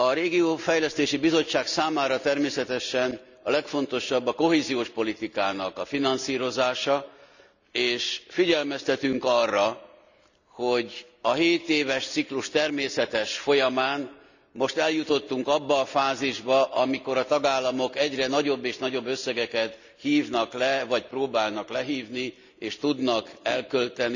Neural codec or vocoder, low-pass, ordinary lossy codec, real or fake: none; 7.2 kHz; none; real